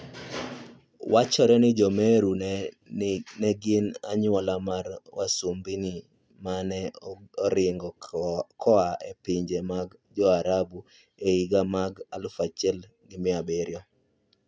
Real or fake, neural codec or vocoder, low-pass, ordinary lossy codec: real; none; none; none